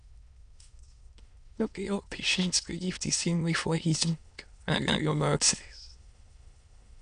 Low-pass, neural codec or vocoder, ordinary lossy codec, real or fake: 9.9 kHz; autoencoder, 22.05 kHz, a latent of 192 numbers a frame, VITS, trained on many speakers; none; fake